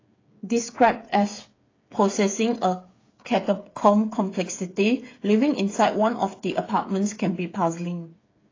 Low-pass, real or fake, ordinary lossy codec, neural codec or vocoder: 7.2 kHz; fake; AAC, 32 kbps; codec, 16 kHz, 8 kbps, FreqCodec, smaller model